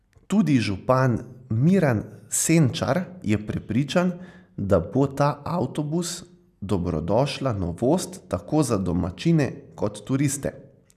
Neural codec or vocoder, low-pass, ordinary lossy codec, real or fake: none; 14.4 kHz; none; real